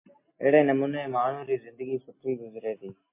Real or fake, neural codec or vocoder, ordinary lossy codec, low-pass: real; none; MP3, 24 kbps; 3.6 kHz